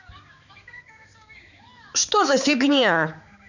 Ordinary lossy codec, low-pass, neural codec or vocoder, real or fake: none; 7.2 kHz; codec, 16 kHz, 4 kbps, X-Codec, HuBERT features, trained on balanced general audio; fake